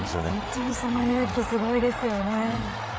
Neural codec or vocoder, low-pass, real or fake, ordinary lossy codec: codec, 16 kHz, 8 kbps, FreqCodec, larger model; none; fake; none